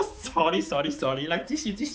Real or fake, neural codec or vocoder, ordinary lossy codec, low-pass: fake; codec, 16 kHz, 4 kbps, X-Codec, HuBERT features, trained on balanced general audio; none; none